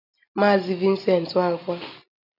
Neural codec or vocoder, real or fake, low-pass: none; real; 5.4 kHz